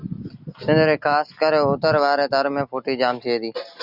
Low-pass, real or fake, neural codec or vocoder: 5.4 kHz; real; none